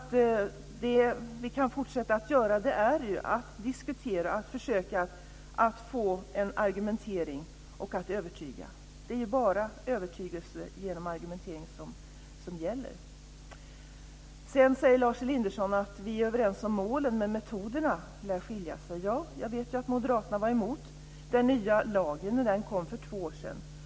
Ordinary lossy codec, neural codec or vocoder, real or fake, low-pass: none; none; real; none